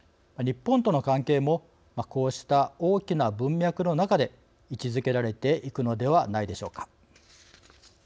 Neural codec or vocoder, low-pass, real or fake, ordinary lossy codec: none; none; real; none